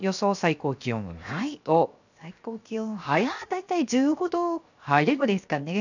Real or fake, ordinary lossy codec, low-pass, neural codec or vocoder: fake; none; 7.2 kHz; codec, 16 kHz, 0.7 kbps, FocalCodec